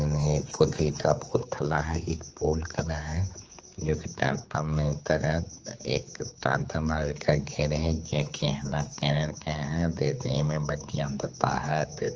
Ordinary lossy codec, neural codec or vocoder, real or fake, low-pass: Opus, 24 kbps; codec, 16 kHz, 4 kbps, X-Codec, HuBERT features, trained on general audio; fake; 7.2 kHz